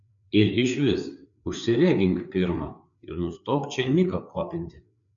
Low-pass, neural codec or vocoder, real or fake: 7.2 kHz; codec, 16 kHz, 4 kbps, FreqCodec, larger model; fake